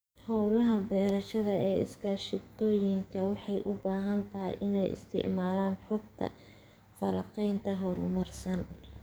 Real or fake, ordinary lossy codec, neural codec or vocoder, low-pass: fake; none; codec, 44.1 kHz, 2.6 kbps, SNAC; none